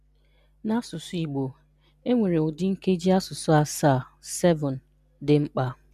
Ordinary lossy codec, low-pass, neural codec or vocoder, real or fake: MP3, 96 kbps; 14.4 kHz; none; real